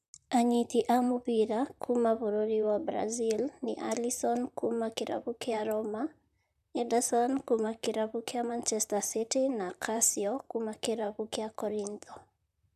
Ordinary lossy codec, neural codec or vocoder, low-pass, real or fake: none; vocoder, 44.1 kHz, 128 mel bands, Pupu-Vocoder; 14.4 kHz; fake